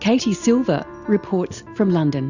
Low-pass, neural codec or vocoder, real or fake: 7.2 kHz; none; real